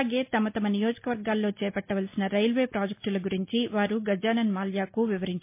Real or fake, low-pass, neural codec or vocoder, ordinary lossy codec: real; 3.6 kHz; none; MP3, 24 kbps